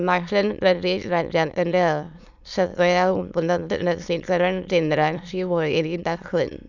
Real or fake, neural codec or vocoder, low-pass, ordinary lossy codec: fake; autoencoder, 22.05 kHz, a latent of 192 numbers a frame, VITS, trained on many speakers; 7.2 kHz; none